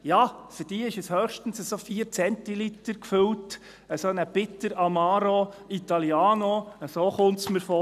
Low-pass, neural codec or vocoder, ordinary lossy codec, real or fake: 14.4 kHz; none; none; real